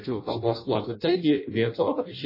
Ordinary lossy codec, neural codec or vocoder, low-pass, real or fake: MP3, 24 kbps; codec, 16 kHz in and 24 kHz out, 0.6 kbps, FireRedTTS-2 codec; 5.4 kHz; fake